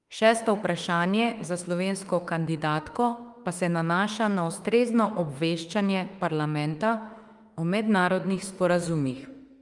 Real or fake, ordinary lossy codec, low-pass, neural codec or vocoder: fake; Opus, 32 kbps; 10.8 kHz; autoencoder, 48 kHz, 32 numbers a frame, DAC-VAE, trained on Japanese speech